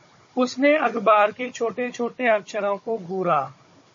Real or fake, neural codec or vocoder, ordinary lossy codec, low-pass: fake; codec, 16 kHz, 16 kbps, FunCodec, trained on Chinese and English, 50 frames a second; MP3, 32 kbps; 7.2 kHz